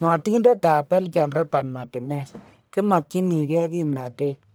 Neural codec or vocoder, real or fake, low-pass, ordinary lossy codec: codec, 44.1 kHz, 1.7 kbps, Pupu-Codec; fake; none; none